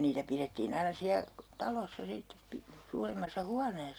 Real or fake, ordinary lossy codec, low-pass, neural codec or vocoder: real; none; none; none